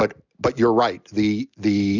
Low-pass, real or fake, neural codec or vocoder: 7.2 kHz; real; none